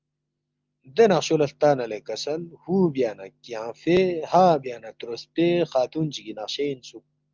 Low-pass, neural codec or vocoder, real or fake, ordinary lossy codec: 7.2 kHz; none; real; Opus, 24 kbps